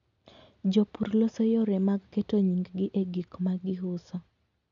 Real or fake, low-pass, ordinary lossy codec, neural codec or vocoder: real; 7.2 kHz; none; none